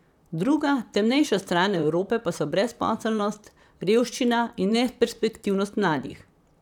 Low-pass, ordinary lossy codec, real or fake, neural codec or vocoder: 19.8 kHz; none; fake; vocoder, 44.1 kHz, 128 mel bands, Pupu-Vocoder